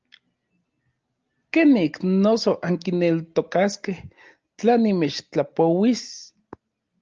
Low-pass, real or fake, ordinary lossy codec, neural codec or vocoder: 7.2 kHz; real; Opus, 24 kbps; none